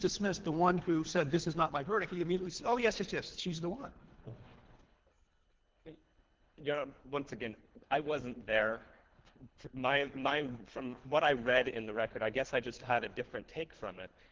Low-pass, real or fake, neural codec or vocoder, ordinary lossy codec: 7.2 kHz; fake; codec, 24 kHz, 3 kbps, HILCodec; Opus, 16 kbps